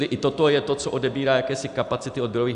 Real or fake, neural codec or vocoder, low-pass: real; none; 10.8 kHz